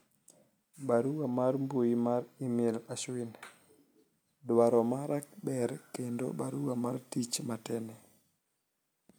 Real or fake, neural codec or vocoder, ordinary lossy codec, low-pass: real; none; none; none